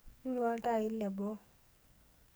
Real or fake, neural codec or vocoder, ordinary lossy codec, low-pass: fake; codec, 44.1 kHz, 2.6 kbps, SNAC; none; none